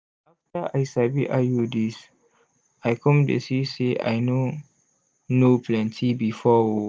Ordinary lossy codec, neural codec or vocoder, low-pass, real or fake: none; none; none; real